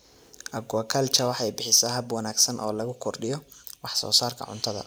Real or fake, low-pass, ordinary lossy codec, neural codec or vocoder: real; none; none; none